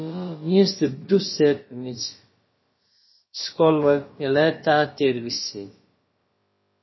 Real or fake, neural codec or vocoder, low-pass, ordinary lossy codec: fake; codec, 16 kHz, about 1 kbps, DyCAST, with the encoder's durations; 7.2 kHz; MP3, 24 kbps